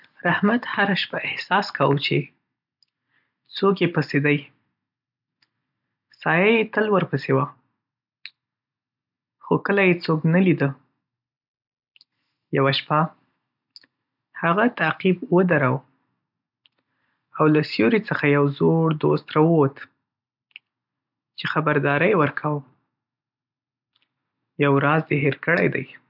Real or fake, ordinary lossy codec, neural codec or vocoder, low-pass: real; none; none; 5.4 kHz